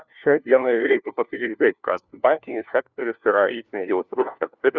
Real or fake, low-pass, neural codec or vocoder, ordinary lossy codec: fake; 7.2 kHz; codec, 16 kHz, 1 kbps, FunCodec, trained on LibriTTS, 50 frames a second; Opus, 64 kbps